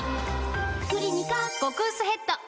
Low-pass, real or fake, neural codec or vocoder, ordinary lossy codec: none; real; none; none